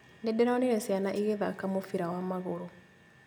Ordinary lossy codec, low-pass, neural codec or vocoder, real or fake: none; none; none; real